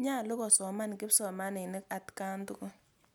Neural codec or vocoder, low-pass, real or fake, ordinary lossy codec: none; none; real; none